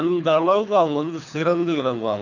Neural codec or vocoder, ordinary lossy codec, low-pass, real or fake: codec, 24 kHz, 3 kbps, HILCodec; none; 7.2 kHz; fake